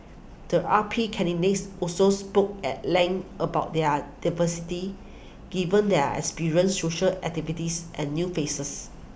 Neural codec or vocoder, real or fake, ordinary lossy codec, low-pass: none; real; none; none